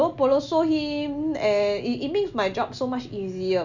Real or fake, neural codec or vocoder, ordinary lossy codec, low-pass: real; none; none; 7.2 kHz